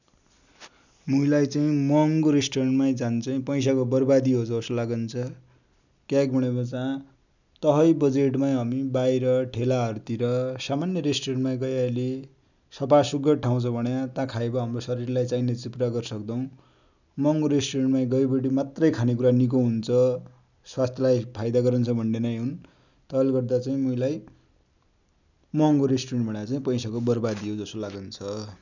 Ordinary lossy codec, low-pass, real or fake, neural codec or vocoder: none; 7.2 kHz; real; none